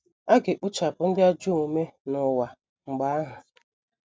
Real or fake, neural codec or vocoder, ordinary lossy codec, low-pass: real; none; none; none